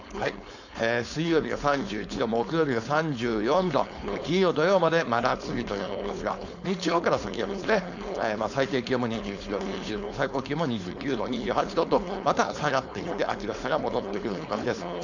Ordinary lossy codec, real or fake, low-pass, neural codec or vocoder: none; fake; 7.2 kHz; codec, 16 kHz, 4.8 kbps, FACodec